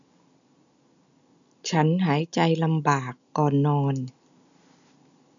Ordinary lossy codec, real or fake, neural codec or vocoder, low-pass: none; real; none; 7.2 kHz